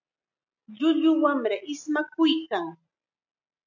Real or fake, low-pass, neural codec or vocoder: real; 7.2 kHz; none